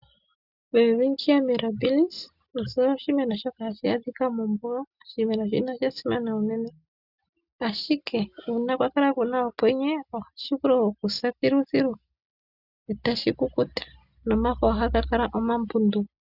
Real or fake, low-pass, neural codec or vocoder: real; 5.4 kHz; none